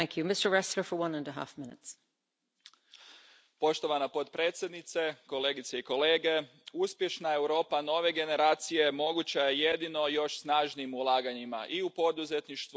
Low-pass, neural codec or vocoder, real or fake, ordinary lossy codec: none; none; real; none